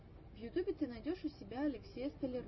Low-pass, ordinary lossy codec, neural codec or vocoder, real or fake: 7.2 kHz; MP3, 24 kbps; none; real